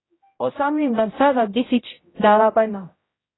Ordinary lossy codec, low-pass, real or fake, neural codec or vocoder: AAC, 16 kbps; 7.2 kHz; fake; codec, 16 kHz, 0.5 kbps, X-Codec, HuBERT features, trained on general audio